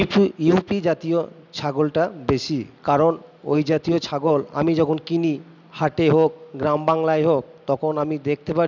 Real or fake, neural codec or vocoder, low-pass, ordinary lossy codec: real; none; 7.2 kHz; none